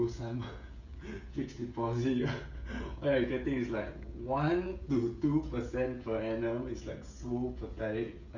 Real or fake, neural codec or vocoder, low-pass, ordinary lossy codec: fake; codec, 16 kHz, 16 kbps, FreqCodec, smaller model; 7.2 kHz; none